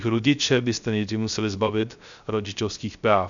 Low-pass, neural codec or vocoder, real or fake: 7.2 kHz; codec, 16 kHz, 0.3 kbps, FocalCodec; fake